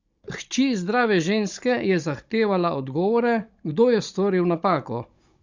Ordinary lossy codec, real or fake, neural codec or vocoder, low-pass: Opus, 64 kbps; fake; codec, 16 kHz, 16 kbps, FunCodec, trained on Chinese and English, 50 frames a second; 7.2 kHz